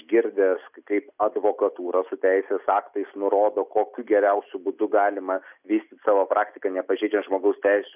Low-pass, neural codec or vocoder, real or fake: 3.6 kHz; none; real